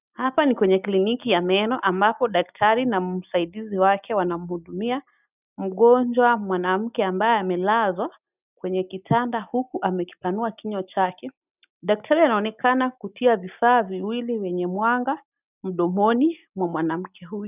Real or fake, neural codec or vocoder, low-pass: real; none; 3.6 kHz